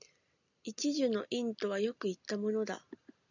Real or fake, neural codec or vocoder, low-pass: real; none; 7.2 kHz